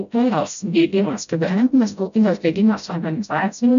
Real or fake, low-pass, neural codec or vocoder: fake; 7.2 kHz; codec, 16 kHz, 0.5 kbps, FreqCodec, smaller model